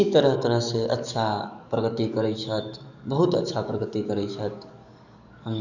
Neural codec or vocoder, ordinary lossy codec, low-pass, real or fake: codec, 44.1 kHz, 7.8 kbps, DAC; none; 7.2 kHz; fake